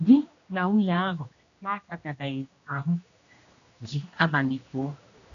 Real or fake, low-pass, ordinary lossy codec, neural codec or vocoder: fake; 7.2 kHz; none; codec, 16 kHz, 1 kbps, X-Codec, HuBERT features, trained on general audio